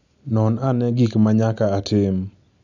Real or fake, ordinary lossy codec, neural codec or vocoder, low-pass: real; none; none; 7.2 kHz